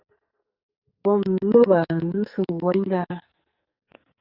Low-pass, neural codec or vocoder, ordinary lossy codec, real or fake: 5.4 kHz; codec, 44.1 kHz, 2.6 kbps, SNAC; Opus, 64 kbps; fake